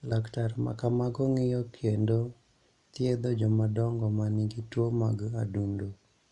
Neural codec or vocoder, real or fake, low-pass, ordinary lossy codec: none; real; 10.8 kHz; none